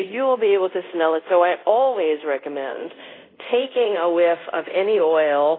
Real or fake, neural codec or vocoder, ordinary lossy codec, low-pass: fake; codec, 24 kHz, 0.5 kbps, DualCodec; AAC, 24 kbps; 5.4 kHz